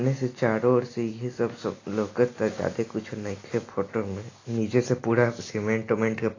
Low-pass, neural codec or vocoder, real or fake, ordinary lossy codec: 7.2 kHz; none; real; AAC, 32 kbps